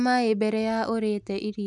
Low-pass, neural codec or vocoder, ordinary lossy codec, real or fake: 10.8 kHz; none; none; real